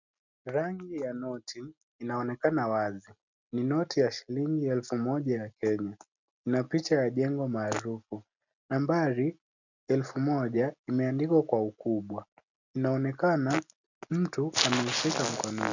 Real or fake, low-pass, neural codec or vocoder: real; 7.2 kHz; none